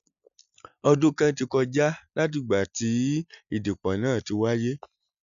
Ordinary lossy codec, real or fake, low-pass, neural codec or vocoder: none; real; 7.2 kHz; none